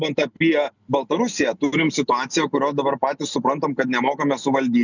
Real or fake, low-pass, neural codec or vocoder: real; 7.2 kHz; none